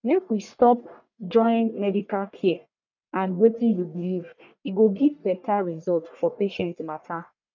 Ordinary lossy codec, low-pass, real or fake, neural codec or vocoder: none; 7.2 kHz; fake; codec, 44.1 kHz, 1.7 kbps, Pupu-Codec